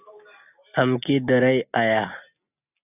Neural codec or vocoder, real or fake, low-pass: none; real; 3.6 kHz